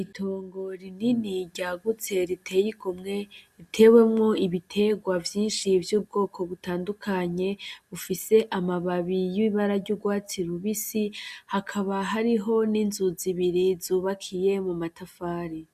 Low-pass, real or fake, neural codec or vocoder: 14.4 kHz; real; none